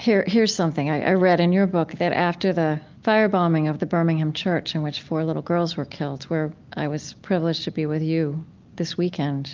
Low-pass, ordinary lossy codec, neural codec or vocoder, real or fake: 7.2 kHz; Opus, 24 kbps; none; real